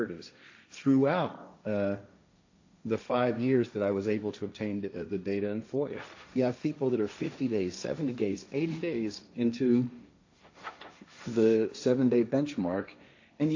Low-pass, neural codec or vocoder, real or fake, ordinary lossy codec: 7.2 kHz; codec, 16 kHz, 1.1 kbps, Voila-Tokenizer; fake; AAC, 48 kbps